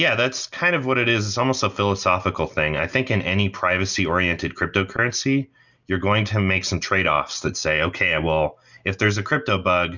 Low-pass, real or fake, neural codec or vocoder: 7.2 kHz; real; none